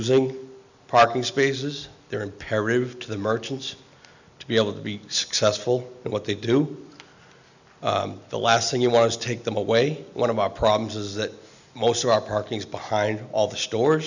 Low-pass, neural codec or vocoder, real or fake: 7.2 kHz; none; real